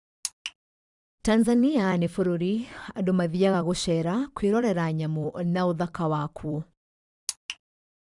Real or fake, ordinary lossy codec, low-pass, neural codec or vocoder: fake; Opus, 64 kbps; 10.8 kHz; vocoder, 44.1 kHz, 128 mel bands every 256 samples, BigVGAN v2